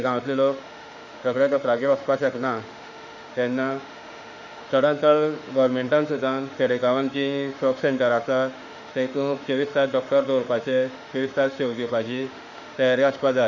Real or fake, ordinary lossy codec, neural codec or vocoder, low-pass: fake; none; autoencoder, 48 kHz, 32 numbers a frame, DAC-VAE, trained on Japanese speech; 7.2 kHz